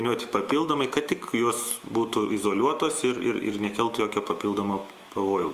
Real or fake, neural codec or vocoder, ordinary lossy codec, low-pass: fake; autoencoder, 48 kHz, 128 numbers a frame, DAC-VAE, trained on Japanese speech; Opus, 32 kbps; 14.4 kHz